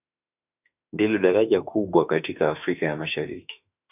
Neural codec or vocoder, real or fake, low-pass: autoencoder, 48 kHz, 32 numbers a frame, DAC-VAE, trained on Japanese speech; fake; 3.6 kHz